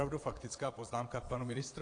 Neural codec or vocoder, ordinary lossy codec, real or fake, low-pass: vocoder, 22.05 kHz, 80 mel bands, Vocos; AAC, 48 kbps; fake; 9.9 kHz